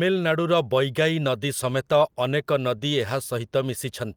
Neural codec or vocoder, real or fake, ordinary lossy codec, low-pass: none; real; Opus, 32 kbps; 19.8 kHz